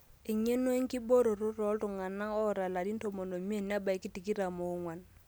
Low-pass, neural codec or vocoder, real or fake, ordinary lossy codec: none; none; real; none